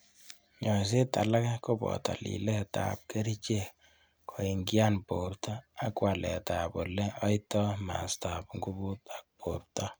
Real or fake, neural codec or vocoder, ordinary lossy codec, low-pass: real; none; none; none